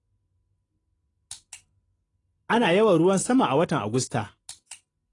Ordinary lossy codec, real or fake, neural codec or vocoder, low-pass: AAC, 32 kbps; real; none; 10.8 kHz